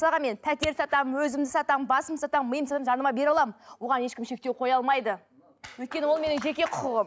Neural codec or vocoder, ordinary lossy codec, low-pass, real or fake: none; none; none; real